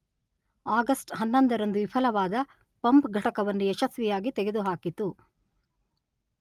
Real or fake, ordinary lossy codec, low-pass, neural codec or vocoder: real; Opus, 32 kbps; 14.4 kHz; none